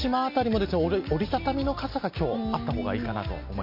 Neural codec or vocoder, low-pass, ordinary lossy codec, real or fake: none; 5.4 kHz; MP3, 32 kbps; real